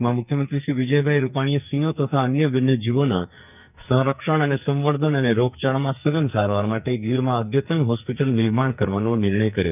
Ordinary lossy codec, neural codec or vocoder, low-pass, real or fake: none; codec, 44.1 kHz, 2.6 kbps, SNAC; 3.6 kHz; fake